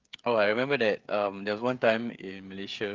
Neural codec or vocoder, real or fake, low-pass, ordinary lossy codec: codec, 16 kHz, 16 kbps, FreqCodec, smaller model; fake; 7.2 kHz; Opus, 32 kbps